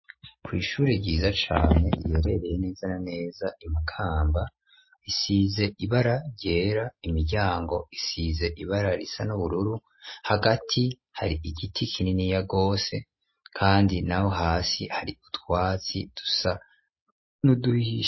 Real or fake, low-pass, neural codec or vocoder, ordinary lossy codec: real; 7.2 kHz; none; MP3, 24 kbps